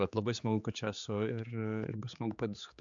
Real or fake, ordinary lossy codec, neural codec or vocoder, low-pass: fake; MP3, 64 kbps; codec, 16 kHz, 4 kbps, X-Codec, HuBERT features, trained on general audio; 7.2 kHz